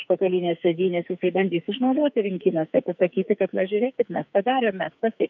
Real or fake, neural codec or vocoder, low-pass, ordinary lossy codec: fake; codec, 44.1 kHz, 2.6 kbps, SNAC; 7.2 kHz; MP3, 48 kbps